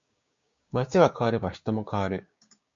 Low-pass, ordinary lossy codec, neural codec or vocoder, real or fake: 7.2 kHz; MP3, 48 kbps; codec, 16 kHz, 6 kbps, DAC; fake